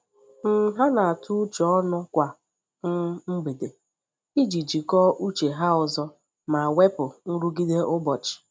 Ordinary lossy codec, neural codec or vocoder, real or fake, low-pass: none; none; real; none